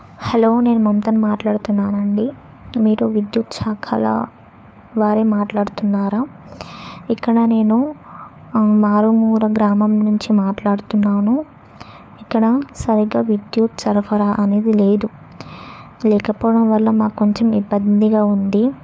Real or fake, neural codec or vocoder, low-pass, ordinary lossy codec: fake; codec, 16 kHz, 4 kbps, FunCodec, trained on LibriTTS, 50 frames a second; none; none